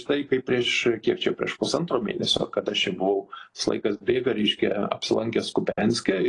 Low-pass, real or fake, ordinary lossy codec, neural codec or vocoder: 10.8 kHz; real; AAC, 32 kbps; none